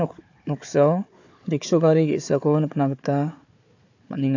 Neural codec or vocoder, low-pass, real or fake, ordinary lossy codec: codec, 16 kHz, 4 kbps, FunCodec, trained on Chinese and English, 50 frames a second; 7.2 kHz; fake; AAC, 48 kbps